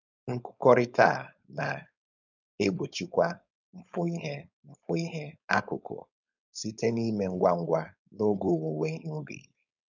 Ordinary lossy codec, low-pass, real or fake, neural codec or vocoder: none; 7.2 kHz; fake; codec, 16 kHz, 4.8 kbps, FACodec